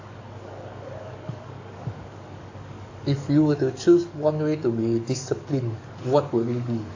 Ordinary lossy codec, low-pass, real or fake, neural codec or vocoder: AAC, 48 kbps; 7.2 kHz; fake; codec, 44.1 kHz, 7.8 kbps, DAC